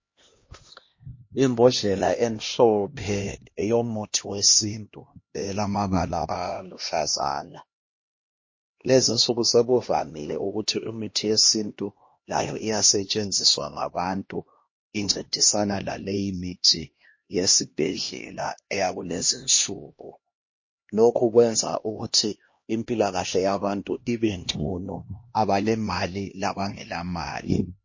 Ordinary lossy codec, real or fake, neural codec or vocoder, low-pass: MP3, 32 kbps; fake; codec, 16 kHz, 1 kbps, X-Codec, HuBERT features, trained on LibriSpeech; 7.2 kHz